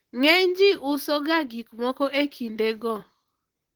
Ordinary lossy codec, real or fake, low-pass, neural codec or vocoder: Opus, 16 kbps; fake; 19.8 kHz; vocoder, 44.1 kHz, 128 mel bands, Pupu-Vocoder